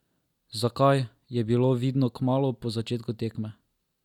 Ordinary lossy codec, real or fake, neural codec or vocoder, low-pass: none; real; none; 19.8 kHz